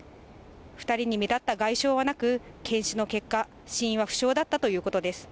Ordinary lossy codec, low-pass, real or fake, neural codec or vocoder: none; none; real; none